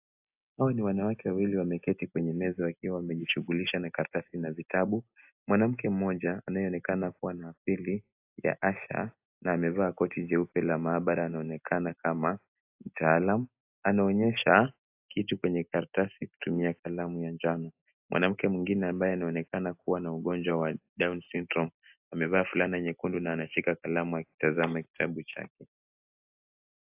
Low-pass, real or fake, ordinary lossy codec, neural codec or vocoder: 3.6 kHz; real; AAC, 32 kbps; none